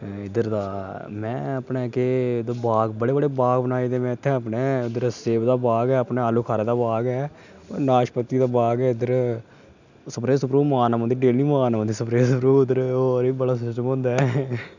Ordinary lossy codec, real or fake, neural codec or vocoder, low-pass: none; real; none; 7.2 kHz